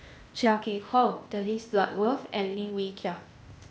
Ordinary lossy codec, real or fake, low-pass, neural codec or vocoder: none; fake; none; codec, 16 kHz, 0.8 kbps, ZipCodec